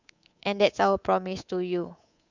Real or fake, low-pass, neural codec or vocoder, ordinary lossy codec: fake; 7.2 kHz; codec, 24 kHz, 3.1 kbps, DualCodec; Opus, 64 kbps